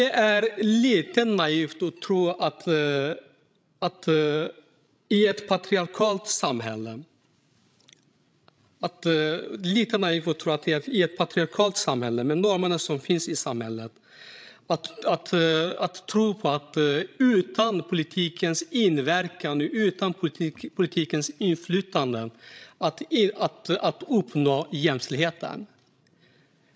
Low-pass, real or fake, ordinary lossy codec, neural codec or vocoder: none; fake; none; codec, 16 kHz, 16 kbps, FreqCodec, larger model